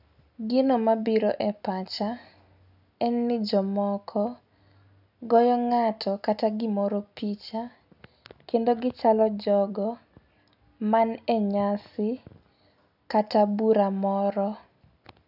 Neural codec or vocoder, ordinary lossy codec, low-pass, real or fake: none; none; 5.4 kHz; real